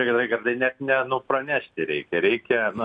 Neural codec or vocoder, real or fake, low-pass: none; real; 9.9 kHz